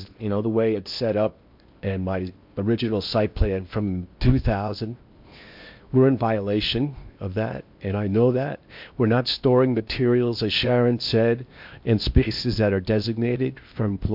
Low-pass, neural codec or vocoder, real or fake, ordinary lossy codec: 5.4 kHz; codec, 16 kHz in and 24 kHz out, 0.8 kbps, FocalCodec, streaming, 65536 codes; fake; MP3, 48 kbps